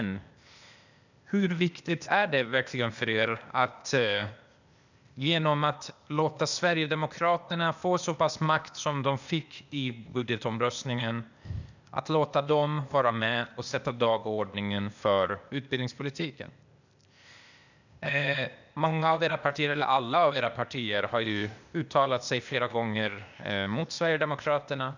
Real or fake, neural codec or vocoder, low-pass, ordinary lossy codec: fake; codec, 16 kHz, 0.8 kbps, ZipCodec; 7.2 kHz; none